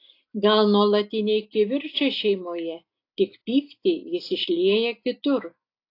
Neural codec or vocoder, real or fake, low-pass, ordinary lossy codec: none; real; 5.4 kHz; AAC, 32 kbps